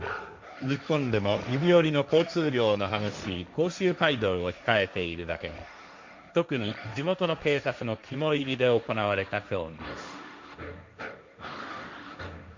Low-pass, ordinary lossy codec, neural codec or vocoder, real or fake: 7.2 kHz; MP3, 64 kbps; codec, 16 kHz, 1.1 kbps, Voila-Tokenizer; fake